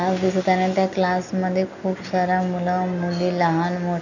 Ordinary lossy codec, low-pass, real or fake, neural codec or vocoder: none; 7.2 kHz; real; none